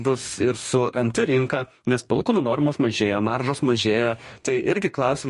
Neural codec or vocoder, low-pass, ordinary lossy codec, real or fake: codec, 44.1 kHz, 2.6 kbps, DAC; 14.4 kHz; MP3, 48 kbps; fake